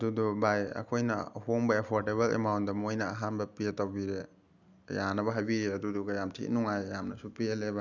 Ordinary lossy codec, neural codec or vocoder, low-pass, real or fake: none; none; 7.2 kHz; real